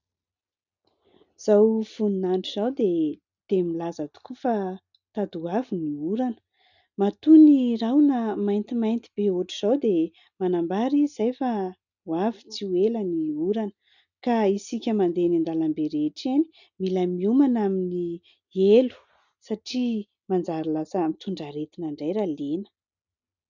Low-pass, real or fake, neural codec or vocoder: 7.2 kHz; real; none